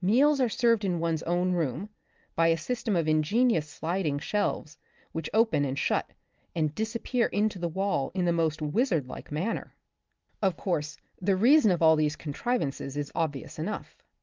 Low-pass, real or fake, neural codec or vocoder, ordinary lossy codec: 7.2 kHz; real; none; Opus, 32 kbps